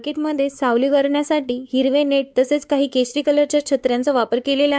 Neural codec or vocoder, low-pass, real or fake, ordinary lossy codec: codec, 16 kHz, 4 kbps, X-Codec, WavLM features, trained on Multilingual LibriSpeech; none; fake; none